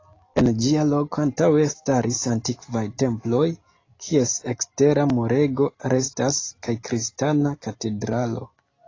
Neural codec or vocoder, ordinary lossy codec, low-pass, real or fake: none; AAC, 32 kbps; 7.2 kHz; real